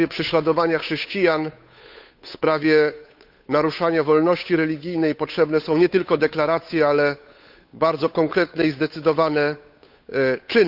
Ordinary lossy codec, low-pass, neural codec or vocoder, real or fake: none; 5.4 kHz; codec, 16 kHz, 8 kbps, FunCodec, trained on Chinese and English, 25 frames a second; fake